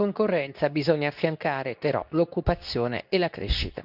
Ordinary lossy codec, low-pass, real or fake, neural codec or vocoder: none; 5.4 kHz; fake; codec, 24 kHz, 0.9 kbps, WavTokenizer, medium speech release version 2